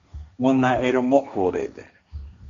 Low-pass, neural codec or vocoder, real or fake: 7.2 kHz; codec, 16 kHz, 1.1 kbps, Voila-Tokenizer; fake